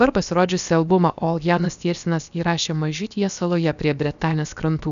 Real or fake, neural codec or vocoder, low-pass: fake; codec, 16 kHz, about 1 kbps, DyCAST, with the encoder's durations; 7.2 kHz